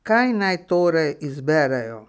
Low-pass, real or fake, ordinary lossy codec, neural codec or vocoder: none; real; none; none